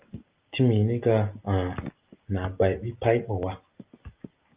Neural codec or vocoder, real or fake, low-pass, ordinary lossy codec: none; real; 3.6 kHz; Opus, 24 kbps